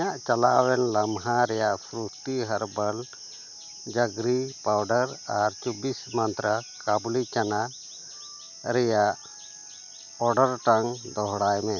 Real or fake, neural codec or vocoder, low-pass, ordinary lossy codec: real; none; 7.2 kHz; none